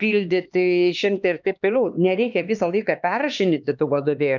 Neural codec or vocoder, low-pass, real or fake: codec, 16 kHz, 2 kbps, X-Codec, HuBERT features, trained on LibriSpeech; 7.2 kHz; fake